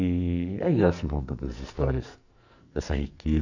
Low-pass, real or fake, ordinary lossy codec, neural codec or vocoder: 7.2 kHz; fake; none; codec, 44.1 kHz, 2.6 kbps, SNAC